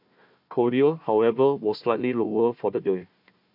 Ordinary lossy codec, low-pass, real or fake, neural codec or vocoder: AAC, 48 kbps; 5.4 kHz; fake; codec, 16 kHz, 1 kbps, FunCodec, trained on Chinese and English, 50 frames a second